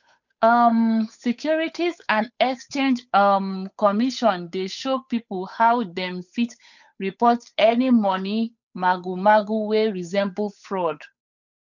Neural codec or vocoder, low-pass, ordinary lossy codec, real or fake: codec, 16 kHz, 8 kbps, FunCodec, trained on Chinese and English, 25 frames a second; 7.2 kHz; none; fake